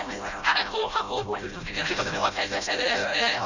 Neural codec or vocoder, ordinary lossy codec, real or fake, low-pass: codec, 16 kHz, 0.5 kbps, FreqCodec, smaller model; none; fake; 7.2 kHz